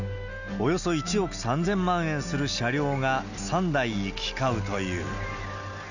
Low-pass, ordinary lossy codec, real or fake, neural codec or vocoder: 7.2 kHz; none; real; none